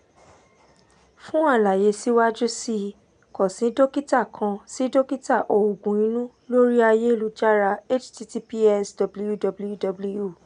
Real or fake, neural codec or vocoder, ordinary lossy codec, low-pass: real; none; none; 9.9 kHz